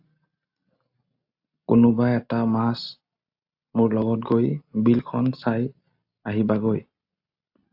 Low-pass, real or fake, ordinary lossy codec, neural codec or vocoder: 5.4 kHz; real; AAC, 48 kbps; none